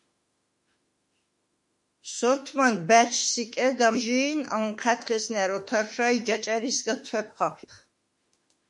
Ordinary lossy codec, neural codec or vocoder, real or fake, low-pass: MP3, 48 kbps; autoencoder, 48 kHz, 32 numbers a frame, DAC-VAE, trained on Japanese speech; fake; 10.8 kHz